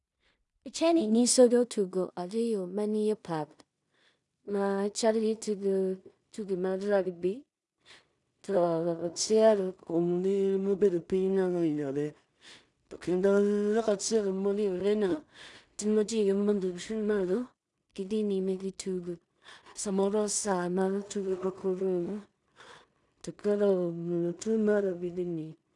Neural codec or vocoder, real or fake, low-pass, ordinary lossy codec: codec, 16 kHz in and 24 kHz out, 0.4 kbps, LongCat-Audio-Codec, two codebook decoder; fake; 10.8 kHz; none